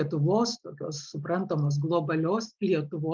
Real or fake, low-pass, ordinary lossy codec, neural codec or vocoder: real; 7.2 kHz; Opus, 32 kbps; none